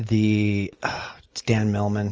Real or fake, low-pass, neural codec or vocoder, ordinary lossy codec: real; 7.2 kHz; none; Opus, 24 kbps